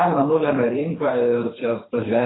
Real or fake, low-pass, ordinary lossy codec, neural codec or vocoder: fake; 7.2 kHz; AAC, 16 kbps; codec, 24 kHz, 3 kbps, HILCodec